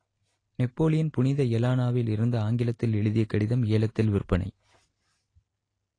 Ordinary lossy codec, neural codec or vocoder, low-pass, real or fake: AAC, 32 kbps; none; 9.9 kHz; real